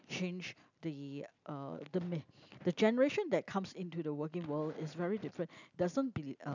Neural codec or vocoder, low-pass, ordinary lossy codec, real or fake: none; 7.2 kHz; none; real